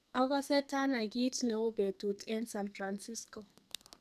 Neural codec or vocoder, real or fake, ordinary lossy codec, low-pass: codec, 44.1 kHz, 2.6 kbps, SNAC; fake; none; 14.4 kHz